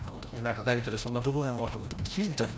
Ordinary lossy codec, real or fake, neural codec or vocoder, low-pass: none; fake; codec, 16 kHz, 0.5 kbps, FreqCodec, larger model; none